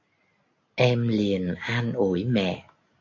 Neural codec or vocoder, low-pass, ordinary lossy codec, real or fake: none; 7.2 kHz; AAC, 48 kbps; real